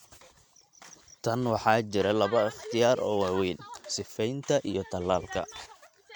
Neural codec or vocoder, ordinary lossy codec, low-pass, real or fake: none; MP3, 96 kbps; 19.8 kHz; real